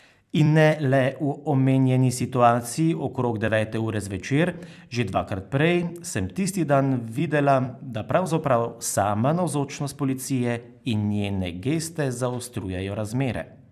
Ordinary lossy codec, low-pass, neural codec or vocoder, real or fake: none; 14.4 kHz; vocoder, 44.1 kHz, 128 mel bands every 256 samples, BigVGAN v2; fake